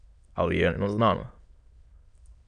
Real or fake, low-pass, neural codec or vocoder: fake; 9.9 kHz; autoencoder, 22.05 kHz, a latent of 192 numbers a frame, VITS, trained on many speakers